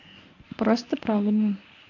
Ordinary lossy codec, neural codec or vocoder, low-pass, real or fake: AAC, 48 kbps; codec, 24 kHz, 0.9 kbps, WavTokenizer, medium speech release version 1; 7.2 kHz; fake